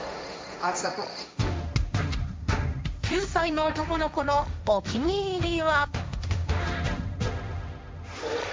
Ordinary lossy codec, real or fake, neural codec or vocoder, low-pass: none; fake; codec, 16 kHz, 1.1 kbps, Voila-Tokenizer; none